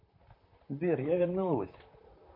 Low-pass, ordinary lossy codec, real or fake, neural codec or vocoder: 5.4 kHz; MP3, 32 kbps; fake; vocoder, 44.1 kHz, 128 mel bands, Pupu-Vocoder